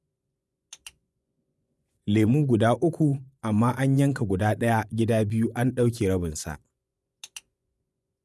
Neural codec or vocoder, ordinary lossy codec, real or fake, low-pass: vocoder, 24 kHz, 100 mel bands, Vocos; none; fake; none